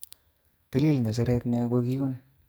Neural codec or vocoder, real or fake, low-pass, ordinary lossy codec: codec, 44.1 kHz, 2.6 kbps, SNAC; fake; none; none